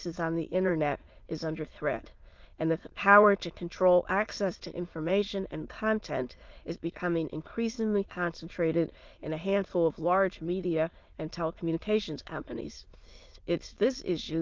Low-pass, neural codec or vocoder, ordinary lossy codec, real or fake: 7.2 kHz; autoencoder, 22.05 kHz, a latent of 192 numbers a frame, VITS, trained on many speakers; Opus, 32 kbps; fake